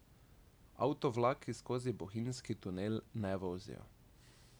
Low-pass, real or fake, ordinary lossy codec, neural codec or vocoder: none; real; none; none